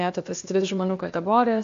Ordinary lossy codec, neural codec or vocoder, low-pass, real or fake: AAC, 48 kbps; codec, 16 kHz, 0.8 kbps, ZipCodec; 7.2 kHz; fake